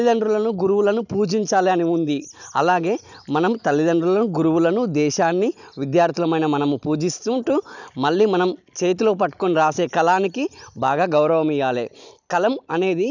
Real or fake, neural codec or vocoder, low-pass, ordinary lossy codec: real; none; 7.2 kHz; none